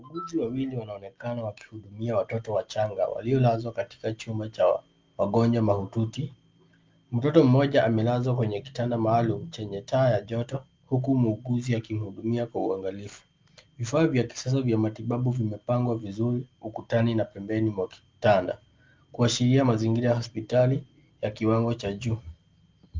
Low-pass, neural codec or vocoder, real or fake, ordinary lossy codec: 7.2 kHz; none; real; Opus, 24 kbps